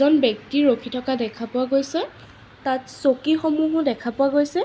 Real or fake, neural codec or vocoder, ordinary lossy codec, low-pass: real; none; none; none